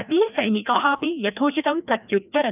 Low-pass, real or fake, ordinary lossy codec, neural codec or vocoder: 3.6 kHz; fake; none; codec, 16 kHz, 1 kbps, FreqCodec, larger model